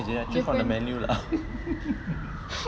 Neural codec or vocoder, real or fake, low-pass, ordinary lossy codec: none; real; none; none